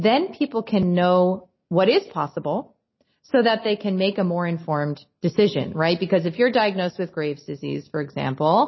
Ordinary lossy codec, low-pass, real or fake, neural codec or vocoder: MP3, 24 kbps; 7.2 kHz; real; none